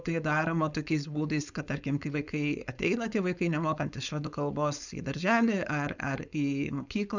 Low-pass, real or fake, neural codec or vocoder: 7.2 kHz; fake; codec, 16 kHz, 4.8 kbps, FACodec